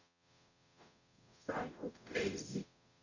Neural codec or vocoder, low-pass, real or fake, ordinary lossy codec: codec, 44.1 kHz, 0.9 kbps, DAC; 7.2 kHz; fake; none